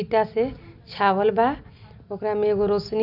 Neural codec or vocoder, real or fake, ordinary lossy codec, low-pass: none; real; none; 5.4 kHz